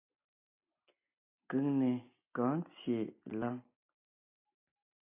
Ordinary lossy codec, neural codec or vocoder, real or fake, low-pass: AAC, 24 kbps; none; real; 3.6 kHz